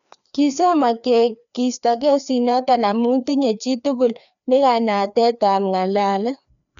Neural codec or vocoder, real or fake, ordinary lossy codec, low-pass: codec, 16 kHz, 2 kbps, FreqCodec, larger model; fake; none; 7.2 kHz